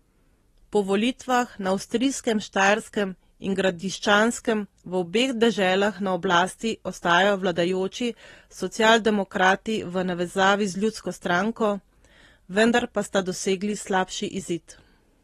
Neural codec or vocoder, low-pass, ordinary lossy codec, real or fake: none; 19.8 kHz; AAC, 32 kbps; real